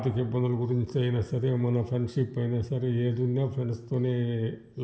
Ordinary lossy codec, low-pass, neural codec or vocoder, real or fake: none; none; none; real